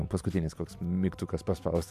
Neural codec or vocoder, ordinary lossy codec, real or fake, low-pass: none; MP3, 96 kbps; real; 14.4 kHz